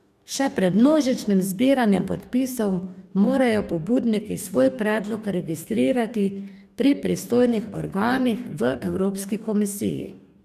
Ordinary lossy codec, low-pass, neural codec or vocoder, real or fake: none; 14.4 kHz; codec, 44.1 kHz, 2.6 kbps, DAC; fake